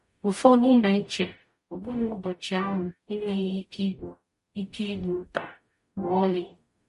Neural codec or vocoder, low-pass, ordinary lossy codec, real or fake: codec, 44.1 kHz, 0.9 kbps, DAC; 14.4 kHz; MP3, 48 kbps; fake